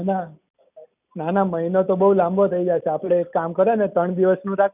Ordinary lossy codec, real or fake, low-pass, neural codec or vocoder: none; real; 3.6 kHz; none